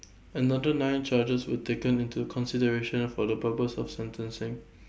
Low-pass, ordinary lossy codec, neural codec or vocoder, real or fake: none; none; none; real